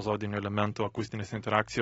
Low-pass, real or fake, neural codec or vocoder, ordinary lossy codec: 9.9 kHz; real; none; AAC, 24 kbps